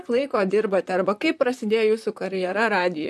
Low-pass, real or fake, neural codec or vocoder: 14.4 kHz; fake; vocoder, 44.1 kHz, 128 mel bands, Pupu-Vocoder